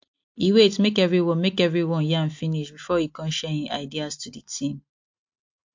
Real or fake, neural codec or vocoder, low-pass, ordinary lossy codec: real; none; 7.2 kHz; MP3, 48 kbps